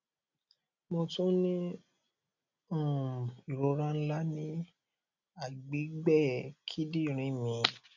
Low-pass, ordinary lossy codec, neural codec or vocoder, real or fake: 7.2 kHz; none; none; real